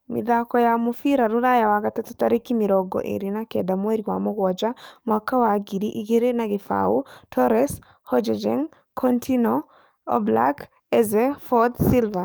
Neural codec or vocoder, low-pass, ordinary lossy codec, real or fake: codec, 44.1 kHz, 7.8 kbps, DAC; none; none; fake